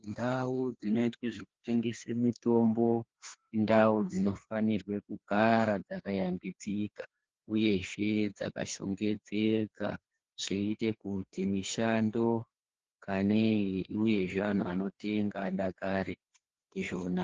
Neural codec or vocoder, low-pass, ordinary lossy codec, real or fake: codec, 16 kHz, 2 kbps, FreqCodec, larger model; 7.2 kHz; Opus, 16 kbps; fake